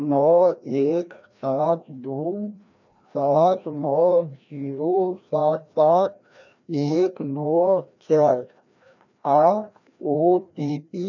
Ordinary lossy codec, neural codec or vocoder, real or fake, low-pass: none; codec, 16 kHz, 1 kbps, FreqCodec, larger model; fake; 7.2 kHz